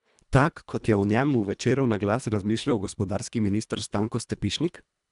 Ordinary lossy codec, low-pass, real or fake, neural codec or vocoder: MP3, 96 kbps; 10.8 kHz; fake; codec, 24 kHz, 1.5 kbps, HILCodec